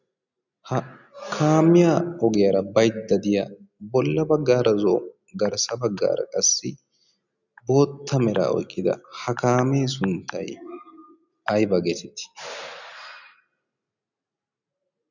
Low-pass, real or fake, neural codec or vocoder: 7.2 kHz; real; none